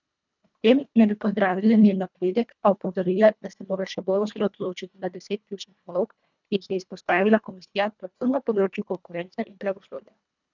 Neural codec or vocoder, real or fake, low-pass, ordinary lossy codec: codec, 24 kHz, 1.5 kbps, HILCodec; fake; 7.2 kHz; none